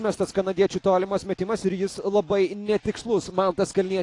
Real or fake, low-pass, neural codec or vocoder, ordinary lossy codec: real; 10.8 kHz; none; AAC, 48 kbps